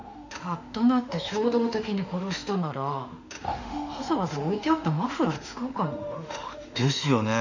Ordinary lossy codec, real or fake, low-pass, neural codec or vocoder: none; fake; 7.2 kHz; autoencoder, 48 kHz, 32 numbers a frame, DAC-VAE, trained on Japanese speech